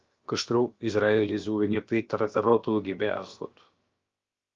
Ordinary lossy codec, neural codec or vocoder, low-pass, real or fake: Opus, 32 kbps; codec, 16 kHz, about 1 kbps, DyCAST, with the encoder's durations; 7.2 kHz; fake